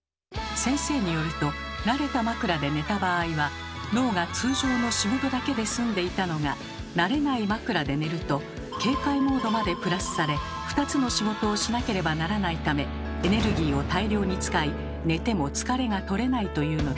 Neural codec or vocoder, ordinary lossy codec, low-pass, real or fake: none; none; none; real